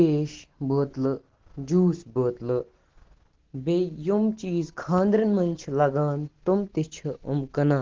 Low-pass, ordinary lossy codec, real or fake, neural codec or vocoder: 7.2 kHz; Opus, 16 kbps; real; none